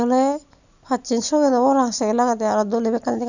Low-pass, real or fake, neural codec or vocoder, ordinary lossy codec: 7.2 kHz; real; none; none